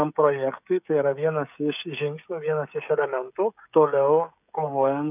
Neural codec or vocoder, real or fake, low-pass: codec, 16 kHz, 16 kbps, FreqCodec, smaller model; fake; 3.6 kHz